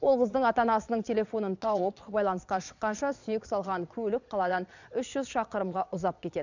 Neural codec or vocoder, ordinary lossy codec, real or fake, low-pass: vocoder, 22.05 kHz, 80 mel bands, Vocos; none; fake; 7.2 kHz